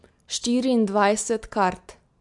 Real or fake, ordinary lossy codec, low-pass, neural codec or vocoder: real; MP3, 64 kbps; 10.8 kHz; none